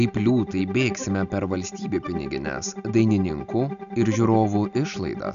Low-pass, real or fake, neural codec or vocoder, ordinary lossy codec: 7.2 kHz; real; none; MP3, 96 kbps